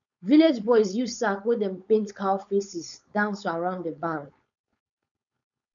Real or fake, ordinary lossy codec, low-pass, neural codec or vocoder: fake; none; 7.2 kHz; codec, 16 kHz, 4.8 kbps, FACodec